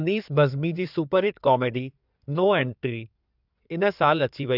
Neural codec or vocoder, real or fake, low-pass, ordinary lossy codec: codec, 16 kHz in and 24 kHz out, 2.2 kbps, FireRedTTS-2 codec; fake; 5.4 kHz; none